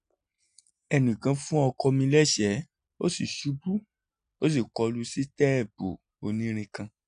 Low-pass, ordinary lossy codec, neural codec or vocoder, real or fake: 9.9 kHz; none; none; real